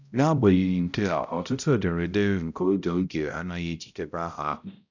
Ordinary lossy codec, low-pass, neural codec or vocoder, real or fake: none; 7.2 kHz; codec, 16 kHz, 0.5 kbps, X-Codec, HuBERT features, trained on balanced general audio; fake